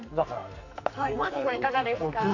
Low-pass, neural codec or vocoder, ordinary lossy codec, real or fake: 7.2 kHz; codec, 44.1 kHz, 2.6 kbps, SNAC; Opus, 64 kbps; fake